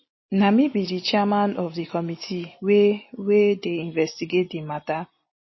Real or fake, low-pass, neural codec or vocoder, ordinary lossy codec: real; 7.2 kHz; none; MP3, 24 kbps